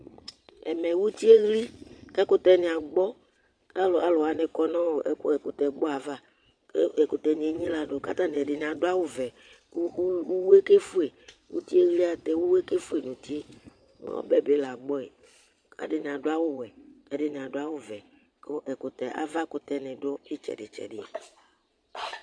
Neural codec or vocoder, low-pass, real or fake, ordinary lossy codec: vocoder, 22.05 kHz, 80 mel bands, Vocos; 9.9 kHz; fake; MP3, 64 kbps